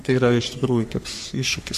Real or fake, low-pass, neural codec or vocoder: fake; 14.4 kHz; codec, 44.1 kHz, 3.4 kbps, Pupu-Codec